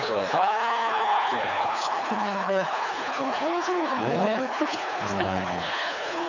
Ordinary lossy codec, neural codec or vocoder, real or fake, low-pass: none; codec, 24 kHz, 3 kbps, HILCodec; fake; 7.2 kHz